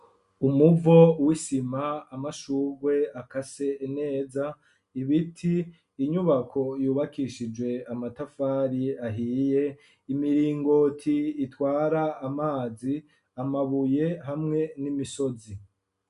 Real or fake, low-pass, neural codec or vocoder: real; 10.8 kHz; none